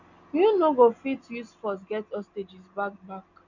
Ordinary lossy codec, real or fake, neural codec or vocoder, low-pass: none; real; none; 7.2 kHz